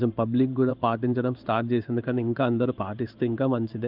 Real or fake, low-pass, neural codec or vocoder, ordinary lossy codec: fake; 5.4 kHz; codec, 16 kHz in and 24 kHz out, 1 kbps, XY-Tokenizer; Opus, 32 kbps